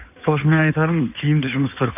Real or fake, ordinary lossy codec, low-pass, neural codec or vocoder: fake; none; 3.6 kHz; codec, 16 kHz in and 24 kHz out, 2.2 kbps, FireRedTTS-2 codec